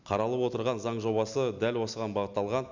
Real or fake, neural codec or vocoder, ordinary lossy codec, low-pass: real; none; Opus, 64 kbps; 7.2 kHz